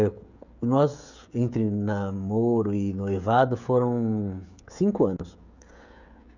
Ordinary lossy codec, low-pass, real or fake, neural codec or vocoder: none; 7.2 kHz; fake; codec, 16 kHz, 16 kbps, FreqCodec, smaller model